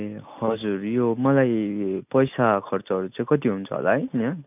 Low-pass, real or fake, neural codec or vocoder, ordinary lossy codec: 3.6 kHz; real; none; none